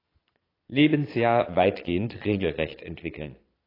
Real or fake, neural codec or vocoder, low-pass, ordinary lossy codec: fake; vocoder, 22.05 kHz, 80 mel bands, Vocos; 5.4 kHz; AAC, 24 kbps